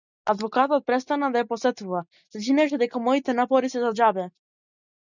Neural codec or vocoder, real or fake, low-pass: none; real; 7.2 kHz